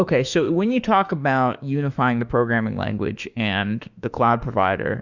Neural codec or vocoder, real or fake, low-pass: autoencoder, 48 kHz, 32 numbers a frame, DAC-VAE, trained on Japanese speech; fake; 7.2 kHz